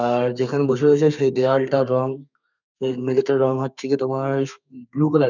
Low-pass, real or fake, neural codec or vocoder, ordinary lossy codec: 7.2 kHz; fake; codec, 32 kHz, 1.9 kbps, SNAC; none